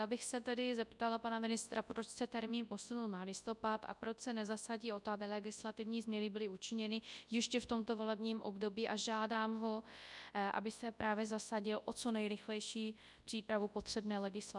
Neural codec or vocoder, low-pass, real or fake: codec, 24 kHz, 0.9 kbps, WavTokenizer, large speech release; 10.8 kHz; fake